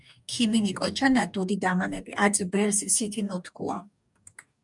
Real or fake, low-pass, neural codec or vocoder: fake; 10.8 kHz; codec, 44.1 kHz, 2.6 kbps, DAC